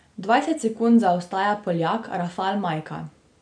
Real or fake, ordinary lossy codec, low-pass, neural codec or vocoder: real; none; 9.9 kHz; none